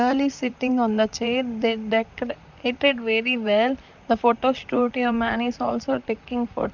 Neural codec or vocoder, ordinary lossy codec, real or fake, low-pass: vocoder, 44.1 kHz, 128 mel bands, Pupu-Vocoder; none; fake; 7.2 kHz